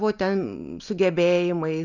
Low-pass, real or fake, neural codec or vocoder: 7.2 kHz; real; none